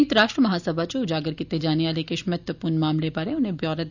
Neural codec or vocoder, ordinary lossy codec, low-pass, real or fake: none; none; 7.2 kHz; real